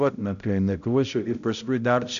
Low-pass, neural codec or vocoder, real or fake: 7.2 kHz; codec, 16 kHz, 0.5 kbps, X-Codec, HuBERT features, trained on balanced general audio; fake